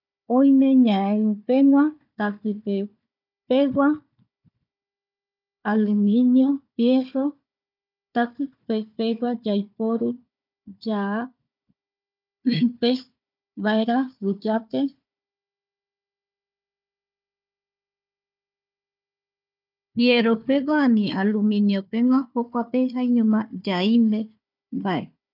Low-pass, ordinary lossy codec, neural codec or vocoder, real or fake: 5.4 kHz; none; codec, 16 kHz, 4 kbps, FunCodec, trained on Chinese and English, 50 frames a second; fake